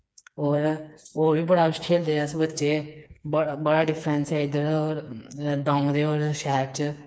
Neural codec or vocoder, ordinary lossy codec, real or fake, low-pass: codec, 16 kHz, 4 kbps, FreqCodec, smaller model; none; fake; none